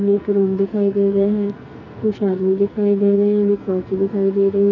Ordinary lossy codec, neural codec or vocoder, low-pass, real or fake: none; codec, 44.1 kHz, 2.6 kbps, SNAC; 7.2 kHz; fake